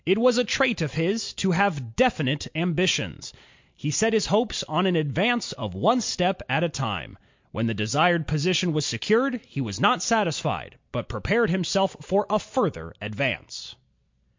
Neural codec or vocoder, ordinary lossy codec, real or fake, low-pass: none; MP3, 48 kbps; real; 7.2 kHz